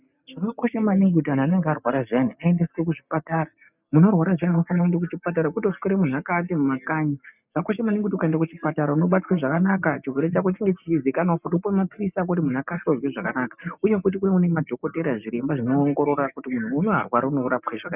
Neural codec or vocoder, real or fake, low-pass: none; real; 3.6 kHz